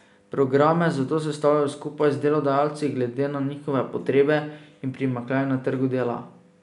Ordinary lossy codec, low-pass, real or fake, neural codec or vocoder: none; 10.8 kHz; real; none